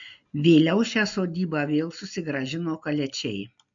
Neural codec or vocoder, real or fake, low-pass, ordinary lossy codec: none; real; 7.2 kHz; AAC, 64 kbps